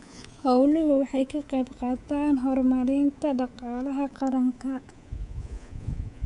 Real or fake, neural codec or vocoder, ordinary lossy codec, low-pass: fake; codec, 24 kHz, 3.1 kbps, DualCodec; none; 10.8 kHz